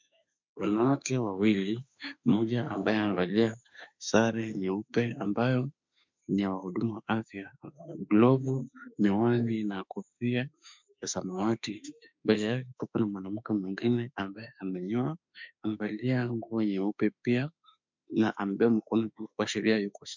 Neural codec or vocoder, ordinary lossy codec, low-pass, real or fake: autoencoder, 48 kHz, 32 numbers a frame, DAC-VAE, trained on Japanese speech; MP3, 64 kbps; 7.2 kHz; fake